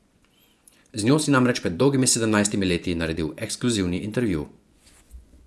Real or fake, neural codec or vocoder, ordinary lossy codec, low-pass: fake; vocoder, 24 kHz, 100 mel bands, Vocos; none; none